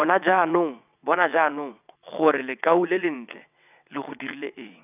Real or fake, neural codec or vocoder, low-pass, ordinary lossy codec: fake; vocoder, 22.05 kHz, 80 mel bands, WaveNeXt; 3.6 kHz; none